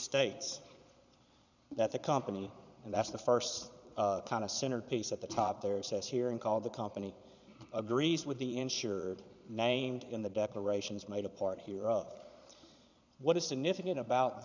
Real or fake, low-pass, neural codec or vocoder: fake; 7.2 kHz; vocoder, 22.05 kHz, 80 mel bands, Vocos